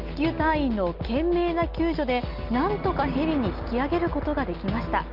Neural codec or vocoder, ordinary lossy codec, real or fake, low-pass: none; Opus, 32 kbps; real; 5.4 kHz